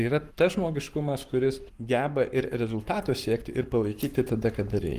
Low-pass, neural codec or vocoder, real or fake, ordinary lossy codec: 14.4 kHz; codec, 44.1 kHz, 7.8 kbps, Pupu-Codec; fake; Opus, 24 kbps